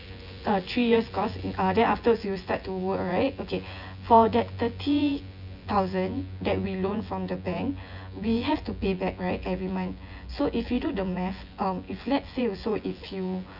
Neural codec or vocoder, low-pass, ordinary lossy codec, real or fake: vocoder, 24 kHz, 100 mel bands, Vocos; 5.4 kHz; none; fake